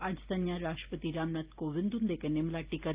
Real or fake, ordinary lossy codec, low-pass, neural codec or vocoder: real; Opus, 32 kbps; 3.6 kHz; none